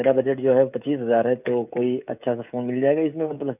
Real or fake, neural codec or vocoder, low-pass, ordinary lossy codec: fake; codec, 16 kHz, 16 kbps, FreqCodec, smaller model; 3.6 kHz; none